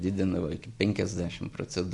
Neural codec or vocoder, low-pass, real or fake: none; 10.8 kHz; real